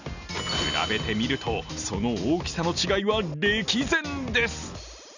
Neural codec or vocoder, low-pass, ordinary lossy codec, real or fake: none; 7.2 kHz; none; real